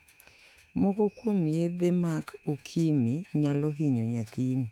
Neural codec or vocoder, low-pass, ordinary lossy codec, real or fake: autoencoder, 48 kHz, 32 numbers a frame, DAC-VAE, trained on Japanese speech; 19.8 kHz; none; fake